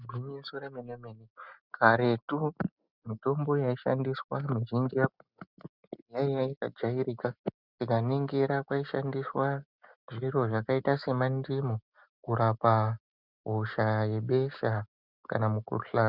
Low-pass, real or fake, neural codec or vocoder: 5.4 kHz; real; none